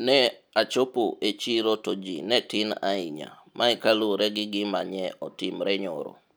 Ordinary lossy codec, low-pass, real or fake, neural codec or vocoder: none; none; real; none